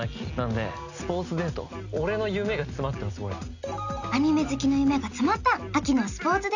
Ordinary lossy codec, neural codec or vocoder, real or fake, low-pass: none; none; real; 7.2 kHz